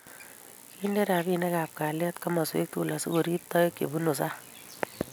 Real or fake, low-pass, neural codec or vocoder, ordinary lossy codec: real; none; none; none